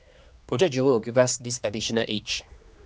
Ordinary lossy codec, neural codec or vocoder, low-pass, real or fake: none; codec, 16 kHz, 2 kbps, X-Codec, HuBERT features, trained on general audio; none; fake